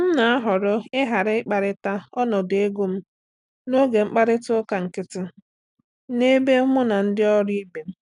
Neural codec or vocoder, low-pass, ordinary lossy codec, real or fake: none; 10.8 kHz; none; real